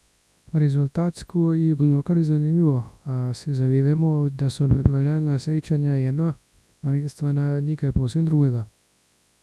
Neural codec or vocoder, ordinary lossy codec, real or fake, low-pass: codec, 24 kHz, 0.9 kbps, WavTokenizer, large speech release; none; fake; none